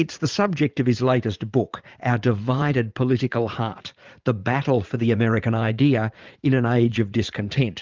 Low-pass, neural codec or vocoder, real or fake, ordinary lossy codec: 7.2 kHz; vocoder, 44.1 kHz, 80 mel bands, Vocos; fake; Opus, 24 kbps